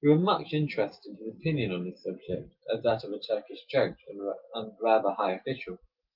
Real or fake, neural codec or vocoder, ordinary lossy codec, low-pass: real; none; Opus, 32 kbps; 5.4 kHz